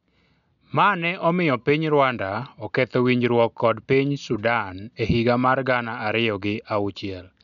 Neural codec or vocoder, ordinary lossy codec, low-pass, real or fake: none; none; 7.2 kHz; real